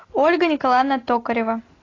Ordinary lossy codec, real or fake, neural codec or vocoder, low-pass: AAC, 32 kbps; real; none; 7.2 kHz